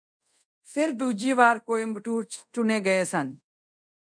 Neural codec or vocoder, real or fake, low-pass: codec, 24 kHz, 0.5 kbps, DualCodec; fake; 9.9 kHz